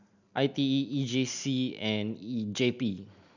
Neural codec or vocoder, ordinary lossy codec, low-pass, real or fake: none; none; 7.2 kHz; real